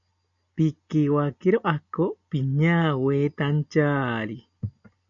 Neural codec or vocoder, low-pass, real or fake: none; 7.2 kHz; real